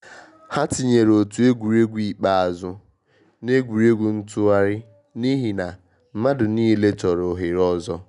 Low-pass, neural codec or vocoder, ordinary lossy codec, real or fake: 10.8 kHz; none; none; real